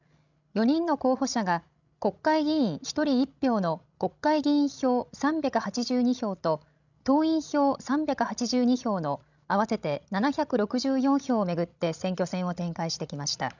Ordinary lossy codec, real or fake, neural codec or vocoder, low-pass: none; fake; codec, 16 kHz, 16 kbps, FreqCodec, larger model; 7.2 kHz